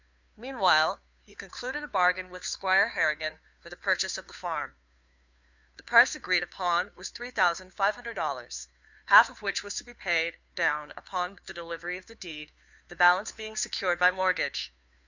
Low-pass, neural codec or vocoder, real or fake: 7.2 kHz; codec, 16 kHz, 2 kbps, FunCodec, trained on Chinese and English, 25 frames a second; fake